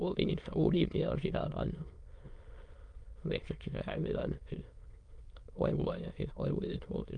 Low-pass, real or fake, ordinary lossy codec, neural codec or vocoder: 9.9 kHz; fake; Opus, 32 kbps; autoencoder, 22.05 kHz, a latent of 192 numbers a frame, VITS, trained on many speakers